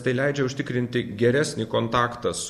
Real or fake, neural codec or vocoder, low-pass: real; none; 14.4 kHz